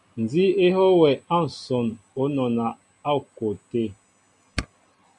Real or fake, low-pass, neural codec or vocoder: real; 10.8 kHz; none